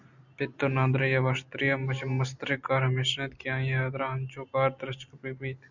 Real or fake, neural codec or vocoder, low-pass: real; none; 7.2 kHz